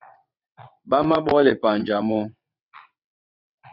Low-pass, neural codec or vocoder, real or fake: 5.4 kHz; vocoder, 22.05 kHz, 80 mel bands, WaveNeXt; fake